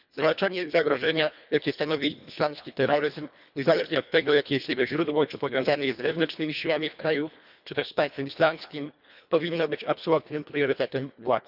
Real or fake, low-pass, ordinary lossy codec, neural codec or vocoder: fake; 5.4 kHz; none; codec, 24 kHz, 1.5 kbps, HILCodec